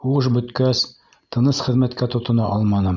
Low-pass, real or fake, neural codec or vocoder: 7.2 kHz; real; none